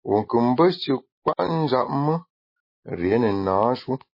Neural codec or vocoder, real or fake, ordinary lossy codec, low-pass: none; real; MP3, 24 kbps; 5.4 kHz